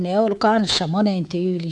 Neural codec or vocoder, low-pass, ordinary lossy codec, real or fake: codec, 24 kHz, 3.1 kbps, DualCodec; 10.8 kHz; none; fake